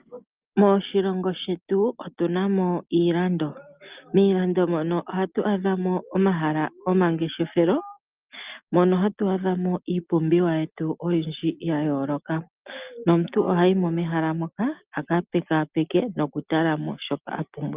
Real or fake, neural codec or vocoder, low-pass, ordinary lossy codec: real; none; 3.6 kHz; Opus, 24 kbps